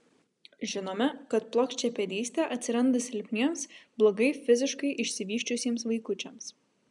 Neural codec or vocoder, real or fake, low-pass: none; real; 10.8 kHz